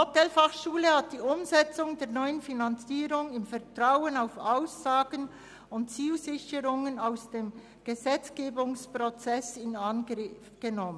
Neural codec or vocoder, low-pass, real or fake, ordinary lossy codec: none; none; real; none